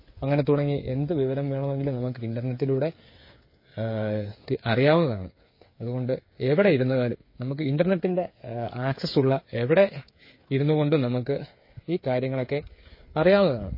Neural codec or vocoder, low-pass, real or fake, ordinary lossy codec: codec, 16 kHz, 8 kbps, FreqCodec, smaller model; 5.4 kHz; fake; MP3, 24 kbps